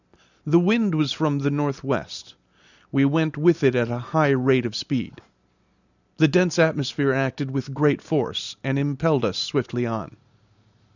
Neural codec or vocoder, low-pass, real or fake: none; 7.2 kHz; real